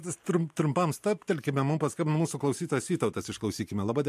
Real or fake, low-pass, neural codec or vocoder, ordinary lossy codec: fake; 14.4 kHz; vocoder, 44.1 kHz, 128 mel bands every 512 samples, BigVGAN v2; MP3, 64 kbps